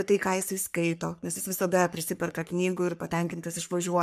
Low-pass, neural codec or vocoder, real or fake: 14.4 kHz; codec, 44.1 kHz, 3.4 kbps, Pupu-Codec; fake